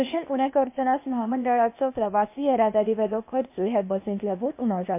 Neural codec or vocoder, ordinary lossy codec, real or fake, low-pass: codec, 16 kHz, 0.8 kbps, ZipCodec; MP3, 24 kbps; fake; 3.6 kHz